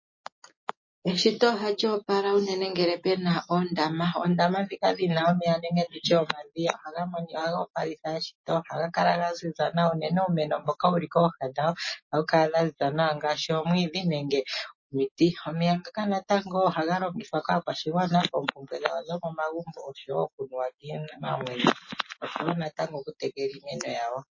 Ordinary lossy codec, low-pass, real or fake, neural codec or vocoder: MP3, 32 kbps; 7.2 kHz; real; none